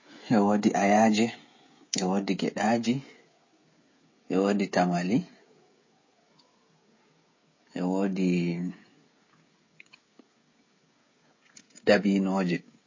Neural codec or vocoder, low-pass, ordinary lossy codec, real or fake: codec, 16 kHz, 16 kbps, FreqCodec, smaller model; 7.2 kHz; MP3, 32 kbps; fake